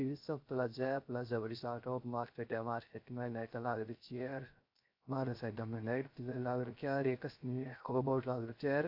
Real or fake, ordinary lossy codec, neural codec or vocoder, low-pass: fake; AAC, 48 kbps; codec, 16 kHz, 0.3 kbps, FocalCodec; 5.4 kHz